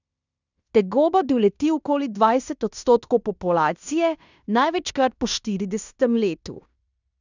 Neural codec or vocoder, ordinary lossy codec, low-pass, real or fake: codec, 16 kHz in and 24 kHz out, 0.9 kbps, LongCat-Audio-Codec, fine tuned four codebook decoder; none; 7.2 kHz; fake